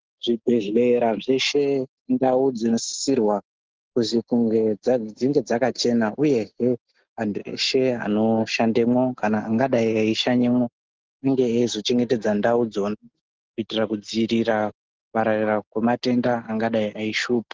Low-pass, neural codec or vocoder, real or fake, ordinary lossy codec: 7.2 kHz; none; real; Opus, 16 kbps